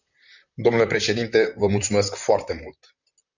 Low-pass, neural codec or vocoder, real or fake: 7.2 kHz; vocoder, 44.1 kHz, 128 mel bands, Pupu-Vocoder; fake